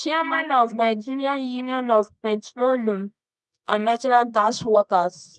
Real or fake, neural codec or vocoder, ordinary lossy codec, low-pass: fake; codec, 24 kHz, 0.9 kbps, WavTokenizer, medium music audio release; none; none